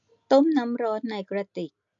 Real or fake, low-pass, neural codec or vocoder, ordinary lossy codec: real; 7.2 kHz; none; MP3, 64 kbps